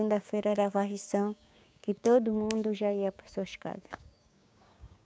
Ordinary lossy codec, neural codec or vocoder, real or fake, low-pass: none; codec, 16 kHz, 6 kbps, DAC; fake; none